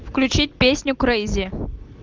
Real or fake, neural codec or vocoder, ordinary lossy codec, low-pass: real; none; Opus, 24 kbps; 7.2 kHz